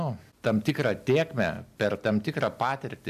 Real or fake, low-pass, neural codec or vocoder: real; 14.4 kHz; none